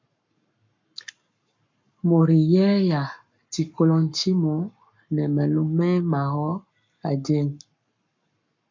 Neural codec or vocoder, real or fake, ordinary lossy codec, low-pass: codec, 44.1 kHz, 7.8 kbps, Pupu-Codec; fake; MP3, 64 kbps; 7.2 kHz